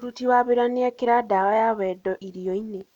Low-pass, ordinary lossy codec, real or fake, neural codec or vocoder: 19.8 kHz; none; real; none